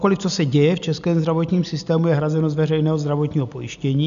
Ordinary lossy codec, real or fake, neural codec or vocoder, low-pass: AAC, 96 kbps; real; none; 7.2 kHz